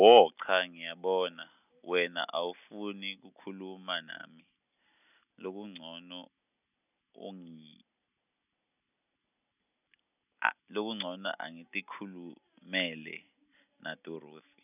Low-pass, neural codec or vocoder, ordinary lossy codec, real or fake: 3.6 kHz; none; none; real